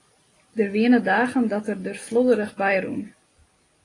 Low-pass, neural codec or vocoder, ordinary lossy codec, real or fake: 10.8 kHz; none; AAC, 32 kbps; real